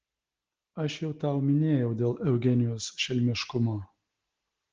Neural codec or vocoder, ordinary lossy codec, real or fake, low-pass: none; Opus, 16 kbps; real; 7.2 kHz